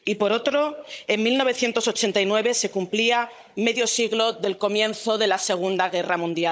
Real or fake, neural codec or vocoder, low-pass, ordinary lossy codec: fake; codec, 16 kHz, 16 kbps, FunCodec, trained on Chinese and English, 50 frames a second; none; none